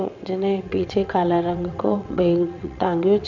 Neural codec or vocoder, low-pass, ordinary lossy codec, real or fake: vocoder, 22.05 kHz, 80 mel bands, WaveNeXt; 7.2 kHz; none; fake